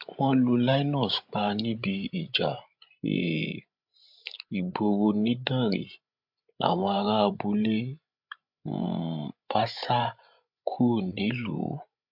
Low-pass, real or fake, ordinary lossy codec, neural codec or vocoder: 5.4 kHz; fake; MP3, 48 kbps; codec, 16 kHz, 16 kbps, FreqCodec, larger model